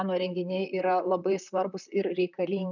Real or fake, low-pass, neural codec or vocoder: fake; 7.2 kHz; vocoder, 44.1 kHz, 128 mel bands, Pupu-Vocoder